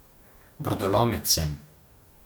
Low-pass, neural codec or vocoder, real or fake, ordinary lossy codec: none; codec, 44.1 kHz, 2.6 kbps, DAC; fake; none